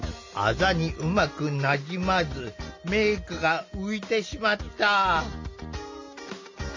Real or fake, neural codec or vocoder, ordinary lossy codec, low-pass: real; none; MP3, 48 kbps; 7.2 kHz